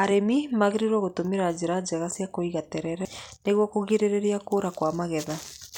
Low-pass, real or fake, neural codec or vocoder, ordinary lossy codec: 19.8 kHz; real; none; none